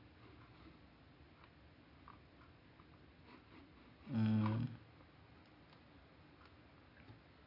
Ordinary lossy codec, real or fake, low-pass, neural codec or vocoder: none; real; 5.4 kHz; none